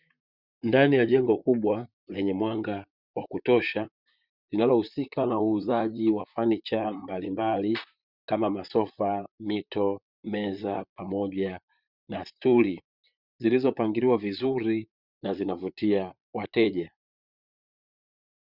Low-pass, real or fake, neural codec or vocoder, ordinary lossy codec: 5.4 kHz; fake; vocoder, 44.1 kHz, 128 mel bands, Pupu-Vocoder; Opus, 64 kbps